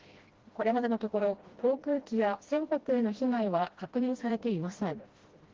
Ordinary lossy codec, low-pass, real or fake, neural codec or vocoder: Opus, 16 kbps; 7.2 kHz; fake; codec, 16 kHz, 1 kbps, FreqCodec, smaller model